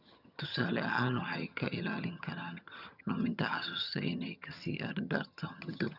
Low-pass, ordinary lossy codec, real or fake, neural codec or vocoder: 5.4 kHz; none; fake; vocoder, 22.05 kHz, 80 mel bands, HiFi-GAN